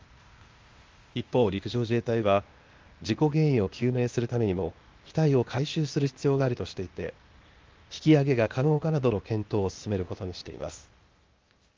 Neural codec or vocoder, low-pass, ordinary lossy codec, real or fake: codec, 16 kHz, 0.8 kbps, ZipCodec; 7.2 kHz; Opus, 32 kbps; fake